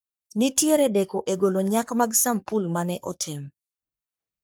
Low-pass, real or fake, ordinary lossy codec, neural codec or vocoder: none; fake; none; codec, 44.1 kHz, 3.4 kbps, Pupu-Codec